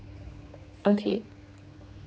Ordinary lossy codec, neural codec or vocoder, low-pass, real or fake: none; codec, 16 kHz, 4 kbps, X-Codec, HuBERT features, trained on general audio; none; fake